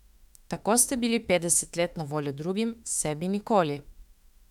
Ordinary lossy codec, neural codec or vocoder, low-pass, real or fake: none; autoencoder, 48 kHz, 32 numbers a frame, DAC-VAE, trained on Japanese speech; 19.8 kHz; fake